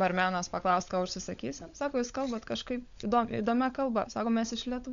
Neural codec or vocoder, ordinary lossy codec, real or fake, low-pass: codec, 16 kHz, 16 kbps, FunCodec, trained on LibriTTS, 50 frames a second; MP3, 64 kbps; fake; 7.2 kHz